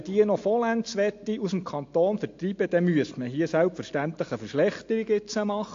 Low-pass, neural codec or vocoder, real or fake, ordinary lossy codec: 7.2 kHz; none; real; AAC, 48 kbps